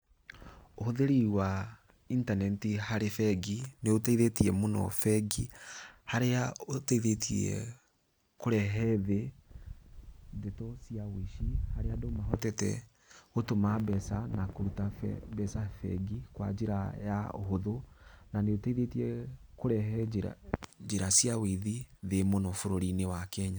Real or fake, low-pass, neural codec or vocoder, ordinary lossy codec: real; none; none; none